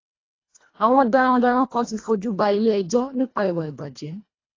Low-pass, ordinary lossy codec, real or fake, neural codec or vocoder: 7.2 kHz; AAC, 32 kbps; fake; codec, 24 kHz, 1.5 kbps, HILCodec